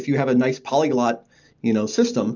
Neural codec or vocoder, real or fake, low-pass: none; real; 7.2 kHz